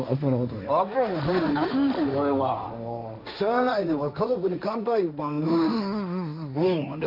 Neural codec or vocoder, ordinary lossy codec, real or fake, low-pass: codec, 16 kHz, 1.1 kbps, Voila-Tokenizer; none; fake; 5.4 kHz